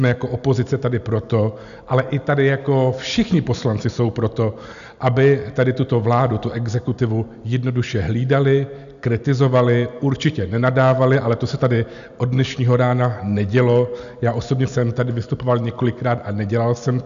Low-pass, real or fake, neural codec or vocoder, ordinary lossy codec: 7.2 kHz; real; none; AAC, 96 kbps